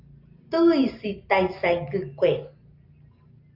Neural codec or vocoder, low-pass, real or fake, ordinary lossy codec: none; 5.4 kHz; real; Opus, 32 kbps